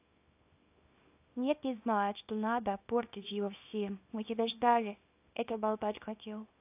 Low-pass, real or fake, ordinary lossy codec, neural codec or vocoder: 3.6 kHz; fake; AAC, 24 kbps; codec, 24 kHz, 0.9 kbps, WavTokenizer, small release